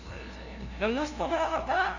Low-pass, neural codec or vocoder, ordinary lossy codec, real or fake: 7.2 kHz; codec, 16 kHz, 0.5 kbps, FunCodec, trained on LibriTTS, 25 frames a second; none; fake